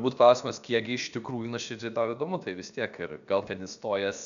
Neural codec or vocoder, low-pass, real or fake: codec, 16 kHz, about 1 kbps, DyCAST, with the encoder's durations; 7.2 kHz; fake